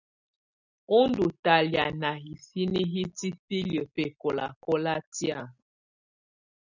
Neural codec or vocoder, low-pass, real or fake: none; 7.2 kHz; real